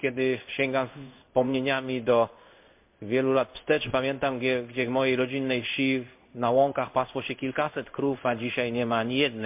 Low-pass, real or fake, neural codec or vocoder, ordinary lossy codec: 3.6 kHz; fake; codec, 16 kHz in and 24 kHz out, 1 kbps, XY-Tokenizer; MP3, 32 kbps